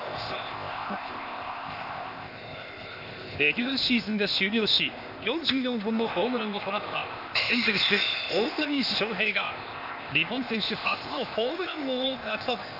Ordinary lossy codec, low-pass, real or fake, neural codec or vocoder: none; 5.4 kHz; fake; codec, 16 kHz, 0.8 kbps, ZipCodec